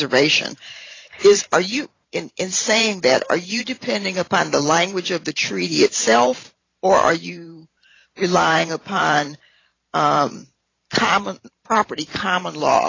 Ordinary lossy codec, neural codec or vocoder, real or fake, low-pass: AAC, 32 kbps; vocoder, 44.1 kHz, 128 mel bands every 512 samples, BigVGAN v2; fake; 7.2 kHz